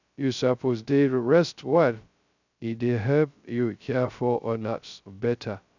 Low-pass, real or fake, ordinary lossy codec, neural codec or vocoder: 7.2 kHz; fake; none; codec, 16 kHz, 0.2 kbps, FocalCodec